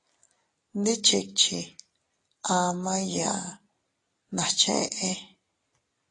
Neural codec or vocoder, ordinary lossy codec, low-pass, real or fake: none; AAC, 32 kbps; 10.8 kHz; real